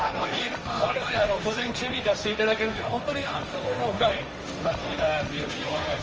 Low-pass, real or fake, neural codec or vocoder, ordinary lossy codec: 7.2 kHz; fake; codec, 16 kHz, 1.1 kbps, Voila-Tokenizer; Opus, 24 kbps